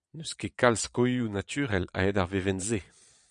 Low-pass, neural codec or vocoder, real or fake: 9.9 kHz; vocoder, 22.05 kHz, 80 mel bands, Vocos; fake